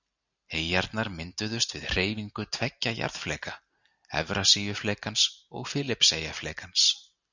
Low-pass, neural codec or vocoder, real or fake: 7.2 kHz; none; real